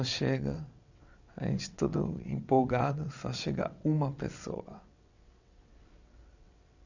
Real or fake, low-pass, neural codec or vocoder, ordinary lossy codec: fake; 7.2 kHz; vocoder, 22.05 kHz, 80 mel bands, WaveNeXt; none